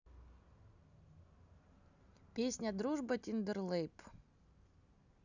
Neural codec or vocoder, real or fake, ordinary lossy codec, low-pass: none; real; none; 7.2 kHz